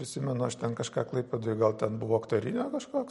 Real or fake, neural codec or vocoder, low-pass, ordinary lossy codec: real; none; 19.8 kHz; MP3, 48 kbps